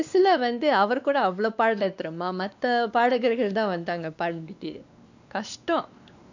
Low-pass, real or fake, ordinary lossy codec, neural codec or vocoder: 7.2 kHz; fake; none; codec, 16 kHz, 2 kbps, X-Codec, WavLM features, trained on Multilingual LibriSpeech